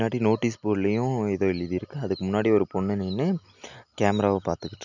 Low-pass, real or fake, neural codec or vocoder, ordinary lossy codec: 7.2 kHz; real; none; none